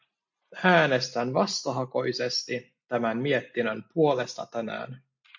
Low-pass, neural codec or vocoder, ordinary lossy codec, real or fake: 7.2 kHz; none; MP3, 48 kbps; real